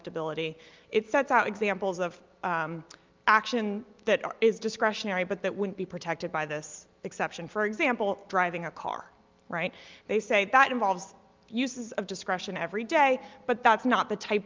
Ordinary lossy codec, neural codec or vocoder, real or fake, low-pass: Opus, 24 kbps; none; real; 7.2 kHz